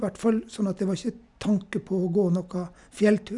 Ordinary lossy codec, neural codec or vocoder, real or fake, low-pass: none; none; real; 10.8 kHz